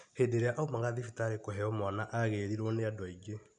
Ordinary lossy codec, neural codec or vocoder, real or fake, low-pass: none; none; real; 9.9 kHz